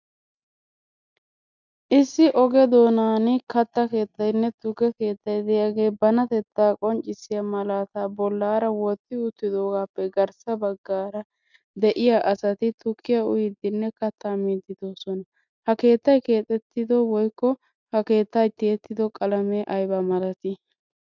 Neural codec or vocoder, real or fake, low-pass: none; real; 7.2 kHz